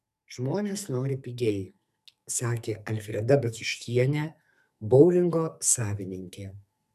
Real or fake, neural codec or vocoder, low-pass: fake; codec, 44.1 kHz, 2.6 kbps, SNAC; 14.4 kHz